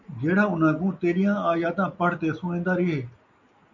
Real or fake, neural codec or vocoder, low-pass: real; none; 7.2 kHz